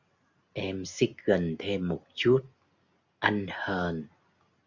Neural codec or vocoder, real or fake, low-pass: none; real; 7.2 kHz